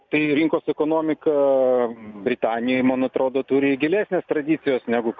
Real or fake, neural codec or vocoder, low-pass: real; none; 7.2 kHz